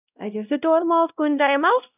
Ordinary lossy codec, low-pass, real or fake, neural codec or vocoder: none; 3.6 kHz; fake; codec, 16 kHz, 0.5 kbps, X-Codec, WavLM features, trained on Multilingual LibriSpeech